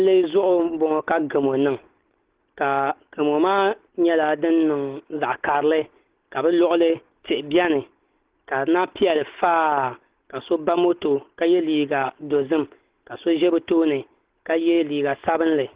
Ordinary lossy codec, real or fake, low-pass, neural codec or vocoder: Opus, 16 kbps; real; 3.6 kHz; none